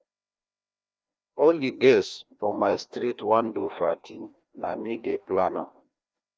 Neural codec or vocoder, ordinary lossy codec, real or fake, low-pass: codec, 16 kHz, 1 kbps, FreqCodec, larger model; none; fake; none